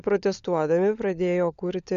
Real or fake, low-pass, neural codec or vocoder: fake; 7.2 kHz; codec, 16 kHz, 16 kbps, FunCodec, trained on LibriTTS, 50 frames a second